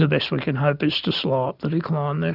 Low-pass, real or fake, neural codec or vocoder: 5.4 kHz; real; none